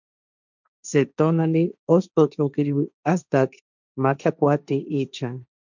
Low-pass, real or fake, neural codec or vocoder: 7.2 kHz; fake; codec, 16 kHz, 1.1 kbps, Voila-Tokenizer